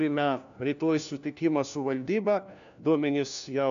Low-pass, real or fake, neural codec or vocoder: 7.2 kHz; fake; codec, 16 kHz, 1 kbps, FunCodec, trained on LibriTTS, 50 frames a second